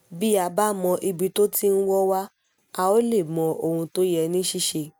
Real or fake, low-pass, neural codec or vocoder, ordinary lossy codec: real; none; none; none